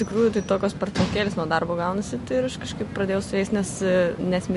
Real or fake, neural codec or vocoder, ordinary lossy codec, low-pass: real; none; MP3, 48 kbps; 14.4 kHz